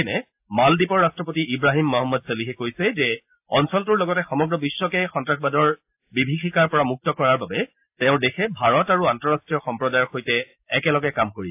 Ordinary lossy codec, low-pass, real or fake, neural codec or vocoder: AAC, 32 kbps; 3.6 kHz; real; none